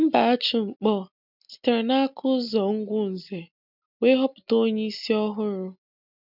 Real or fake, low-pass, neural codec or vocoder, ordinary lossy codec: real; 5.4 kHz; none; none